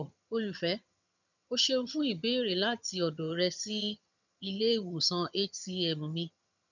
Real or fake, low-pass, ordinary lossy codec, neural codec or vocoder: fake; 7.2 kHz; none; vocoder, 22.05 kHz, 80 mel bands, HiFi-GAN